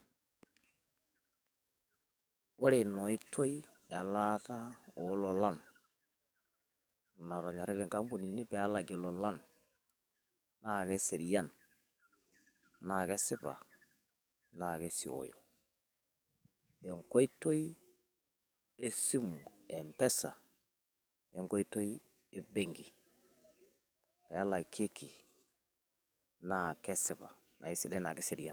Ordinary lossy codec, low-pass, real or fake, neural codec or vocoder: none; none; fake; codec, 44.1 kHz, 7.8 kbps, DAC